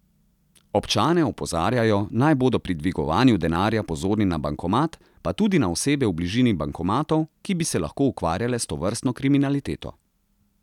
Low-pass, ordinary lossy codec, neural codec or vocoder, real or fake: 19.8 kHz; none; none; real